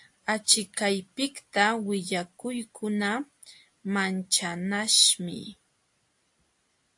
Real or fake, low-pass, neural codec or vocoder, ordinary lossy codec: real; 10.8 kHz; none; AAC, 64 kbps